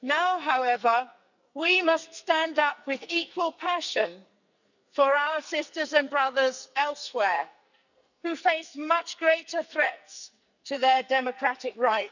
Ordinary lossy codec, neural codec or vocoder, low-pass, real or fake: none; codec, 44.1 kHz, 2.6 kbps, SNAC; 7.2 kHz; fake